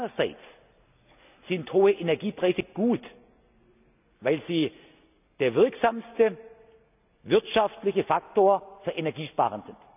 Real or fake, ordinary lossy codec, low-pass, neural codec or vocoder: real; none; 3.6 kHz; none